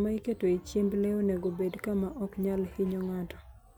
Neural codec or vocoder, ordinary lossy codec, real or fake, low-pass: none; none; real; none